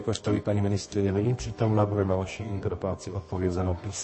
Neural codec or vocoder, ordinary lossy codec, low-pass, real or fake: codec, 24 kHz, 0.9 kbps, WavTokenizer, medium music audio release; MP3, 32 kbps; 9.9 kHz; fake